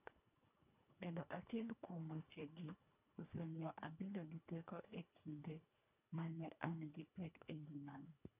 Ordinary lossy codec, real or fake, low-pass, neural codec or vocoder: none; fake; 3.6 kHz; codec, 24 kHz, 1.5 kbps, HILCodec